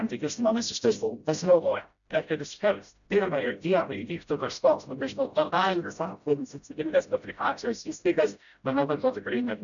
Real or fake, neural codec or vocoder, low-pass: fake; codec, 16 kHz, 0.5 kbps, FreqCodec, smaller model; 7.2 kHz